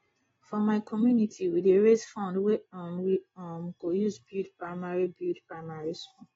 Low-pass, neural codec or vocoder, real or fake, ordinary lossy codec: 10.8 kHz; none; real; AAC, 24 kbps